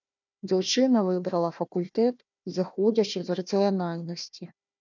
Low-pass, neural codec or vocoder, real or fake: 7.2 kHz; codec, 16 kHz, 1 kbps, FunCodec, trained on Chinese and English, 50 frames a second; fake